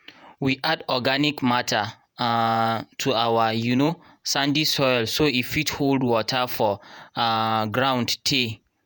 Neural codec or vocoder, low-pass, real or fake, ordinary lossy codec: vocoder, 48 kHz, 128 mel bands, Vocos; none; fake; none